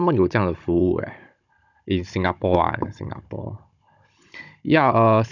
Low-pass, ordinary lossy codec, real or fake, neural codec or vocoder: 7.2 kHz; none; fake; codec, 16 kHz, 16 kbps, FunCodec, trained on Chinese and English, 50 frames a second